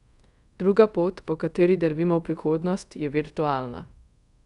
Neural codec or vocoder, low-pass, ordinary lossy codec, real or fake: codec, 24 kHz, 0.5 kbps, DualCodec; 10.8 kHz; none; fake